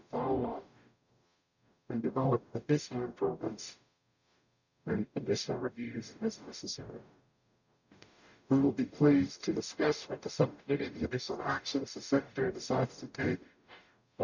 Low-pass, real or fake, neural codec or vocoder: 7.2 kHz; fake; codec, 44.1 kHz, 0.9 kbps, DAC